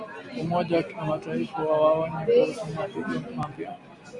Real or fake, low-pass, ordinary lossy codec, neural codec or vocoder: real; 10.8 kHz; AAC, 64 kbps; none